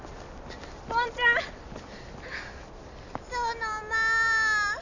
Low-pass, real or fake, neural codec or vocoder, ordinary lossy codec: 7.2 kHz; real; none; none